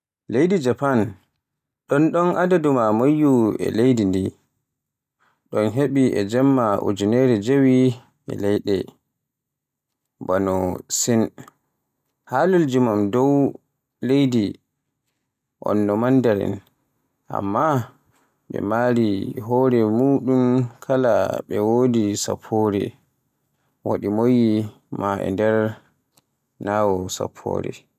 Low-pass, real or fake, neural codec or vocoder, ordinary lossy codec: 14.4 kHz; real; none; none